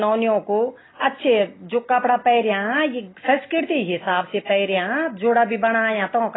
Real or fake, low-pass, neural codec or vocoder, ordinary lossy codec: real; 7.2 kHz; none; AAC, 16 kbps